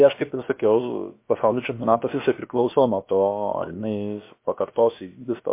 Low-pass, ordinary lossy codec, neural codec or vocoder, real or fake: 3.6 kHz; MP3, 24 kbps; codec, 16 kHz, about 1 kbps, DyCAST, with the encoder's durations; fake